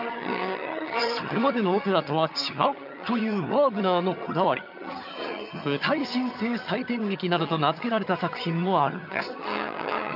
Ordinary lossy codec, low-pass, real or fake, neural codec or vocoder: none; 5.4 kHz; fake; vocoder, 22.05 kHz, 80 mel bands, HiFi-GAN